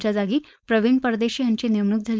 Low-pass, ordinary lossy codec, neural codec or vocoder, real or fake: none; none; codec, 16 kHz, 4.8 kbps, FACodec; fake